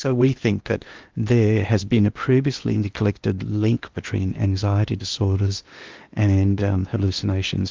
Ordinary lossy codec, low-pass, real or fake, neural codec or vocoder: Opus, 32 kbps; 7.2 kHz; fake; codec, 16 kHz in and 24 kHz out, 0.8 kbps, FocalCodec, streaming, 65536 codes